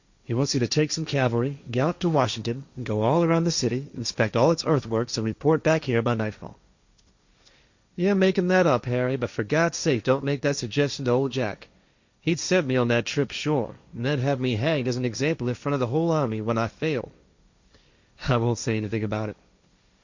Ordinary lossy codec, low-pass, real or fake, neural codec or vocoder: Opus, 64 kbps; 7.2 kHz; fake; codec, 16 kHz, 1.1 kbps, Voila-Tokenizer